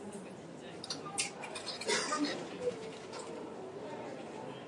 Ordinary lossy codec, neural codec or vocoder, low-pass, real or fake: MP3, 48 kbps; none; 10.8 kHz; real